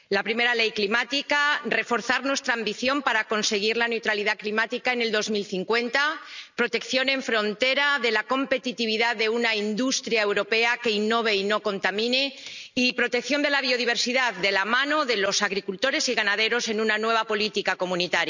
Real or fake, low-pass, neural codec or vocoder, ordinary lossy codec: real; 7.2 kHz; none; none